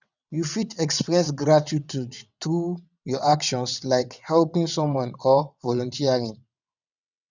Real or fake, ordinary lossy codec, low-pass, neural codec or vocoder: fake; none; 7.2 kHz; vocoder, 22.05 kHz, 80 mel bands, WaveNeXt